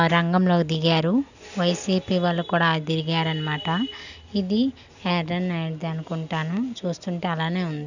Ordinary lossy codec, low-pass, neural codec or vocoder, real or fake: none; 7.2 kHz; none; real